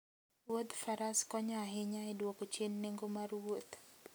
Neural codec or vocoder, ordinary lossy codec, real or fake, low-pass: none; none; real; none